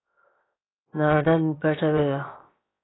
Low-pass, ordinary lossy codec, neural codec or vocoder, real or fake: 7.2 kHz; AAC, 16 kbps; codec, 16 kHz, 0.7 kbps, FocalCodec; fake